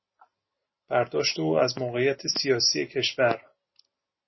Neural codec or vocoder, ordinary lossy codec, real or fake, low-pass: none; MP3, 24 kbps; real; 7.2 kHz